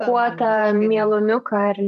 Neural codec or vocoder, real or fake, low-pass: vocoder, 44.1 kHz, 128 mel bands every 512 samples, BigVGAN v2; fake; 14.4 kHz